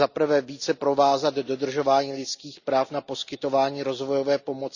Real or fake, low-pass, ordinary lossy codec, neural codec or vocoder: real; 7.2 kHz; none; none